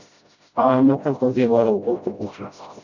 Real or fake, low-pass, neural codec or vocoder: fake; 7.2 kHz; codec, 16 kHz, 0.5 kbps, FreqCodec, smaller model